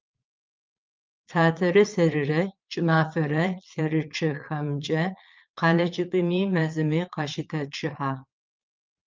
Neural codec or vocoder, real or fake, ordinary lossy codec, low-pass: vocoder, 22.05 kHz, 80 mel bands, Vocos; fake; Opus, 24 kbps; 7.2 kHz